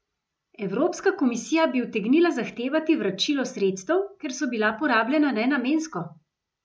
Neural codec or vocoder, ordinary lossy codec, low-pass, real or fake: none; none; none; real